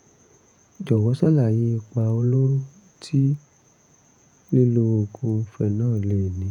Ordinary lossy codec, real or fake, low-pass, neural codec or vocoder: none; fake; 19.8 kHz; vocoder, 48 kHz, 128 mel bands, Vocos